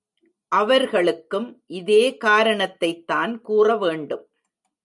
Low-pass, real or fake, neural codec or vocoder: 10.8 kHz; real; none